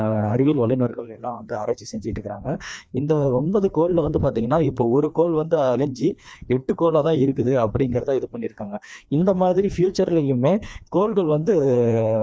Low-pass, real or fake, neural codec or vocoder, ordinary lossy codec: none; fake; codec, 16 kHz, 2 kbps, FreqCodec, larger model; none